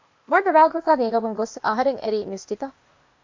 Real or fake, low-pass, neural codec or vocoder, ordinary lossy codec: fake; 7.2 kHz; codec, 16 kHz, 0.8 kbps, ZipCodec; MP3, 48 kbps